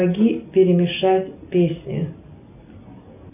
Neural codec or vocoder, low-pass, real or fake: none; 3.6 kHz; real